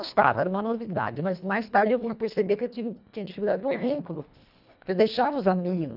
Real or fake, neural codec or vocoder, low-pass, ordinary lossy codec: fake; codec, 24 kHz, 1.5 kbps, HILCodec; 5.4 kHz; none